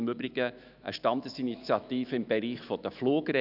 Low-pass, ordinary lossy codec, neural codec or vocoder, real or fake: 5.4 kHz; none; autoencoder, 48 kHz, 128 numbers a frame, DAC-VAE, trained on Japanese speech; fake